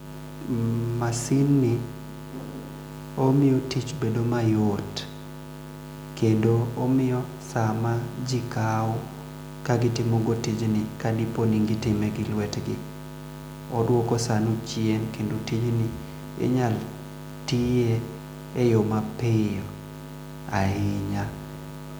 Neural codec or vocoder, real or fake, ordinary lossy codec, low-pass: none; real; none; none